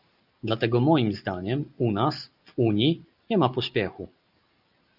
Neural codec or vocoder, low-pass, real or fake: none; 5.4 kHz; real